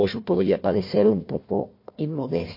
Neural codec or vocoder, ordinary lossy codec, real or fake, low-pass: codec, 16 kHz, 1 kbps, FunCodec, trained on Chinese and English, 50 frames a second; MP3, 32 kbps; fake; 5.4 kHz